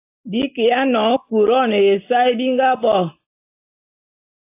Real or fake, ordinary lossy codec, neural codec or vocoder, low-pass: real; AAC, 24 kbps; none; 3.6 kHz